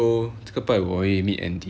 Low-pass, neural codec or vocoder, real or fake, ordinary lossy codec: none; none; real; none